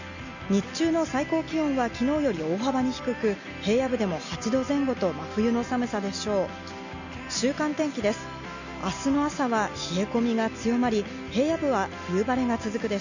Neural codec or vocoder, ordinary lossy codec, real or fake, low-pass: none; none; real; 7.2 kHz